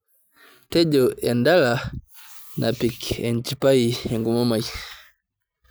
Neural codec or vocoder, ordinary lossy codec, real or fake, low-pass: none; none; real; none